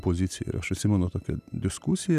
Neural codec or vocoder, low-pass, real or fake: none; 14.4 kHz; real